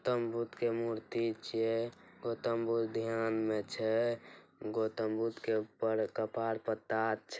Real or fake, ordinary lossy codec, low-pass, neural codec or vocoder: real; none; none; none